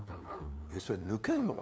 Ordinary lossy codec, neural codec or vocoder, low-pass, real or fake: none; codec, 16 kHz, 2 kbps, FunCodec, trained on LibriTTS, 25 frames a second; none; fake